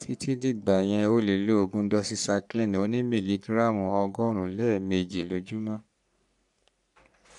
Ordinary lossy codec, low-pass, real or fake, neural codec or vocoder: none; 10.8 kHz; fake; codec, 44.1 kHz, 3.4 kbps, Pupu-Codec